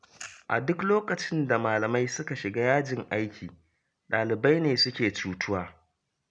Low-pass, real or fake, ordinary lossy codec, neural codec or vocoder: 9.9 kHz; real; none; none